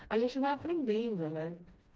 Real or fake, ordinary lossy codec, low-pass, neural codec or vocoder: fake; none; none; codec, 16 kHz, 1 kbps, FreqCodec, smaller model